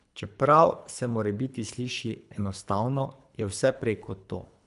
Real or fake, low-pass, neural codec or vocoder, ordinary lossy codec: fake; 10.8 kHz; codec, 24 kHz, 3 kbps, HILCodec; none